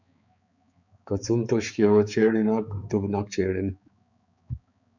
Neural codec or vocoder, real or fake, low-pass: codec, 16 kHz, 4 kbps, X-Codec, HuBERT features, trained on balanced general audio; fake; 7.2 kHz